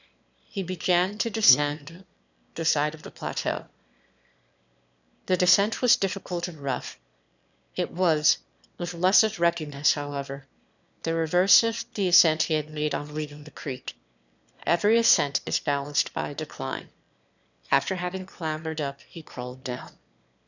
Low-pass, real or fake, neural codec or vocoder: 7.2 kHz; fake; autoencoder, 22.05 kHz, a latent of 192 numbers a frame, VITS, trained on one speaker